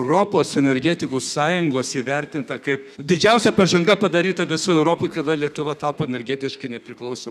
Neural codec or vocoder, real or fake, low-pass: codec, 32 kHz, 1.9 kbps, SNAC; fake; 14.4 kHz